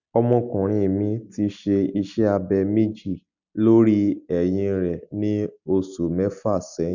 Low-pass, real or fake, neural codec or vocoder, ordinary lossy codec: 7.2 kHz; real; none; none